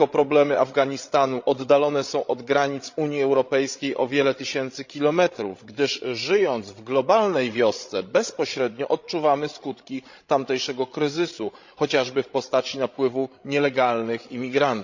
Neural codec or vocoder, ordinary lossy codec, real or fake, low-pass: codec, 16 kHz, 16 kbps, FreqCodec, larger model; Opus, 64 kbps; fake; 7.2 kHz